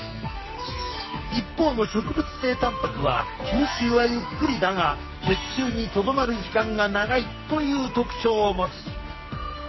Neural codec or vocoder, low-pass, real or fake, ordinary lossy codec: codec, 44.1 kHz, 2.6 kbps, SNAC; 7.2 kHz; fake; MP3, 24 kbps